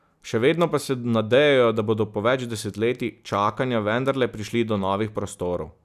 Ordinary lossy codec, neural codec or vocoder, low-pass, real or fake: none; none; 14.4 kHz; real